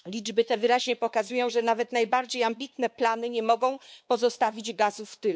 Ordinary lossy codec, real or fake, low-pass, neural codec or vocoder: none; fake; none; codec, 16 kHz, 2 kbps, X-Codec, WavLM features, trained on Multilingual LibriSpeech